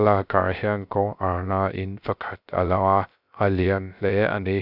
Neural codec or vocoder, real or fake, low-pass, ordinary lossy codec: codec, 16 kHz, 0.3 kbps, FocalCodec; fake; 5.4 kHz; none